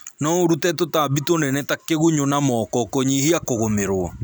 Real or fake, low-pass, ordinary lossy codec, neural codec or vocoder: real; none; none; none